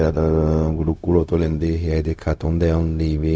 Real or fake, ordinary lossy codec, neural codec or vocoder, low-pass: fake; none; codec, 16 kHz, 0.4 kbps, LongCat-Audio-Codec; none